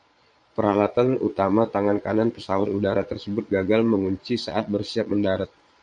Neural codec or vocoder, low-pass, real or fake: vocoder, 22.05 kHz, 80 mel bands, Vocos; 9.9 kHz; fake